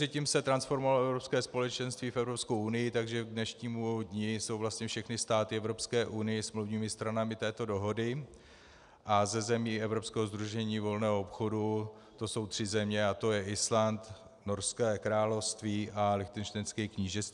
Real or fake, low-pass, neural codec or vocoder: real; 10.8 kHz; none